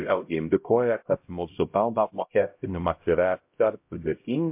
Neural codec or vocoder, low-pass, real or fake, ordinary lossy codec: codec, 16 kHz, 0.5 kbps, X-Codec, HuBERT features, trained on LibriSpeech; 3.6 kHz; fake; MP3, 32 kbps